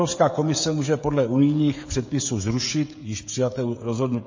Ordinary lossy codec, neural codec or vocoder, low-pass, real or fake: MP3, 32 kbps; codec, 16 kHz, 16 kbps, FreqCodec, smaller model; 7.2 kHz; fake